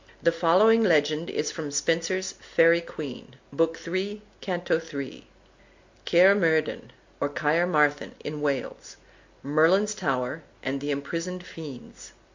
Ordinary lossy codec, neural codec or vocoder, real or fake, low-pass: MP3, 48 kbps; none; real; 7.2 kHz